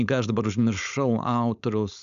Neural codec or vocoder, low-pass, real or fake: codec, 16 kHz, 4.8 kbps, FACodec; 7.2 kHz; fake